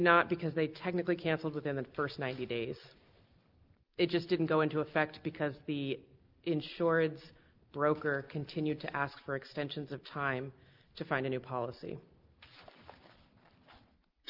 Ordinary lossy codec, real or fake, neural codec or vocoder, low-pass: Opus, 32 kbps; real; none; 5.4 kHz